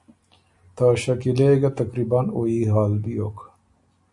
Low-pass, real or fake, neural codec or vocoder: 10.8 kHz; real; none